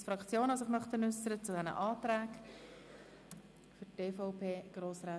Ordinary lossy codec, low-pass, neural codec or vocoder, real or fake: none; none; none; real